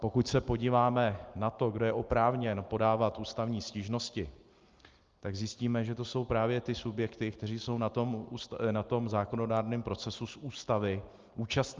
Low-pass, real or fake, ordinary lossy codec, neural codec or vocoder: 7.2 kHz; real; Opus, 32 kbps; none